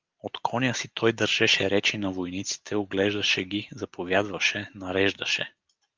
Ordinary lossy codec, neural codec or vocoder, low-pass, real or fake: Opus, 32 kbps; none; 7.2 kHz; real